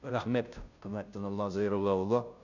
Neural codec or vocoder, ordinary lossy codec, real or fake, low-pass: codec, 16 kHz, 0.5 kbps, FunCodec, trained on Chinese and English, 25 frames a second; none; fake; 7.2 kHz